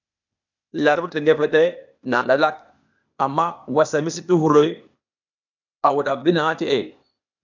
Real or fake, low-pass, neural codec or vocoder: fake; 7.2 kHz; codec, 16 kHz, 0.8 kbps, ZipCodec